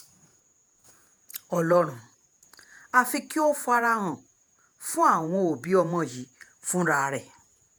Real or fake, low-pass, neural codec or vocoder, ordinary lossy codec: fake; none; vocoder, 48 kHz, 128 mel bands, Vocos; none